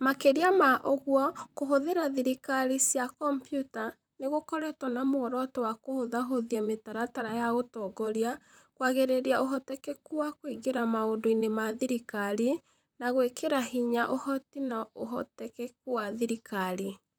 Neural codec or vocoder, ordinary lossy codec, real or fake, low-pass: vocoder, 44.1 kHz, 128 mel bands, Pupu-Vocoder; none; fake; none